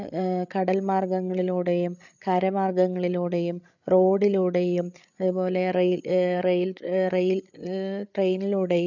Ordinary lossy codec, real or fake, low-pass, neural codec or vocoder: none; fake; 7.2 kHz; codec, 16 kHz, 8 kbps, FreqCodec, larger model